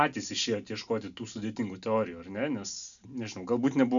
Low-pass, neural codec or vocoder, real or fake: 7.2 kHz; none; real